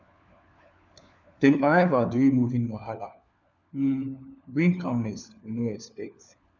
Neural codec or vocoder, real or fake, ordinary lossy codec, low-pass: codec, 16 kHz, 4 kbps, FunCodec, trained on LibriTTS, 50 frames a second; fake; none; 7.2 kHz